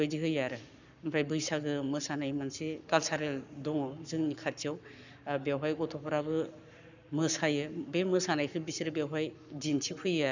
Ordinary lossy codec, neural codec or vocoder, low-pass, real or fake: none; codec, 44.1 kHz, 7.8 kbps, Pupu-Codec; 7.2 kHz; fake